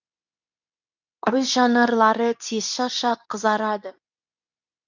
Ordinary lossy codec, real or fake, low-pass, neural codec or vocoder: none; fake; 7.2 kHz; codec, 24 kHz, 0.9 kbps, WavTokenizer, medium speech release version 2